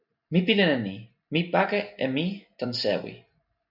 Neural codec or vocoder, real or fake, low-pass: none; real; 5.4 kHz